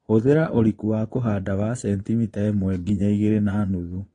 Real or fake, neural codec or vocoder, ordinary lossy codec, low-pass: fake; vocoder, 22.05 kHz, 80 mel bands, Vocos; AAC, 32 kbps; 9.9 kHz